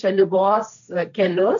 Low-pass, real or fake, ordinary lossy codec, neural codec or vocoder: 7.2 kHz; fake; MP3, 64 kbps; codec, 16 kHz, 1.1 kbps, Voila-Tokenizer